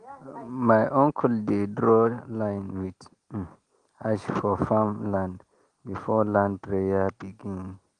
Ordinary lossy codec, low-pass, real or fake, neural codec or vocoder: Opus, 24 kbps; 9.9 kHz; real; none